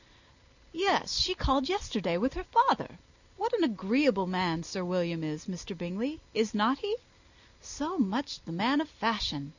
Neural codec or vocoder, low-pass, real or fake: none; 7.2 kHz; real